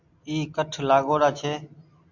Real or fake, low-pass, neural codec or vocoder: real; 7.2 kHz; none